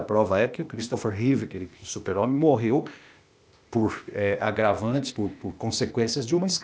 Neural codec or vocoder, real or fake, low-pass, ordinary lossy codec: codec, 16 kHz, 0.8 kbps, ZipCodec; fake; none; none